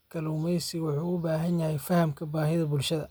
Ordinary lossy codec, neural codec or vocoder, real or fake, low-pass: none; none; real; none